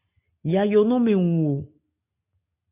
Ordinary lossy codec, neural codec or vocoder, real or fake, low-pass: MP3, 32 kbps; none; real; 3.6 kHz